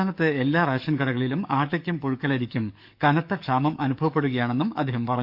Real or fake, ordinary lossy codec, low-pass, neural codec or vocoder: fake; none; 5.4 kHz; codec, 44.1 kHz, 7.8 kbps, DAC